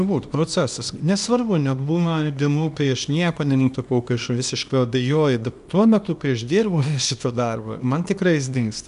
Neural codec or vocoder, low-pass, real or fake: codec, 24 kHz, 0.9 kbps, WavTokenizer, small release; 10.8 kHz; fake